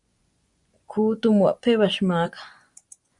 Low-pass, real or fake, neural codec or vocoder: 10.8 kHz; fake; vocoder, 24 kHz, 100 mel bands, Vocos